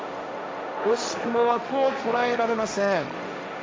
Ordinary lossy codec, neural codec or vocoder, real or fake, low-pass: none; codec, 16 kHz, 1.1 kbps, Voila-Tokenizer; fake; none